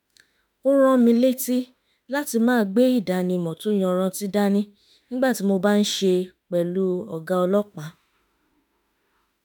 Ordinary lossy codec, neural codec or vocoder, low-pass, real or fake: none; autoencoder, 48 kHz, 32 numbers a frame, DAC-VAE, trained on Japanese speech; none; fake